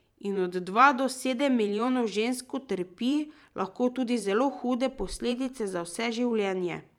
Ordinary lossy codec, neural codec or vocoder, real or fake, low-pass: none; vocoder, 44.1 kHz, 128 mel bands every 512 samples, BigVGAN v2; fake; 19.8 kHz